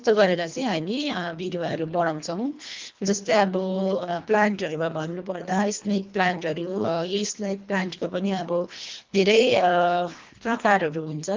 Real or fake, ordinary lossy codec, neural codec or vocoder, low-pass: fake; Opus, 32 kbps; codec, 24 kHz, 1.5 kbps, HILCodec; 7.2 kHz